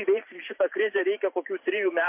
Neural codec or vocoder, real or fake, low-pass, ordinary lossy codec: vocoder, 44.1 kHz, 128 mel bands every 512 samples, BigVGAN v2; fake; 3.6 kHz; MP3, 32 kbps